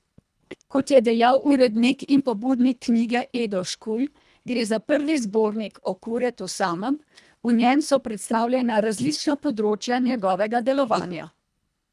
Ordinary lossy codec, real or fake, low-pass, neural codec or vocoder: none; fake; none; codec, 24 kHz, 1.5 kbps, HILCodec